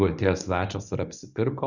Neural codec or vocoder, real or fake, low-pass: codec, 24 kHz, 0.9 kbps, WavTokenizer, medium speech release version 1; fake; 7.2 kHz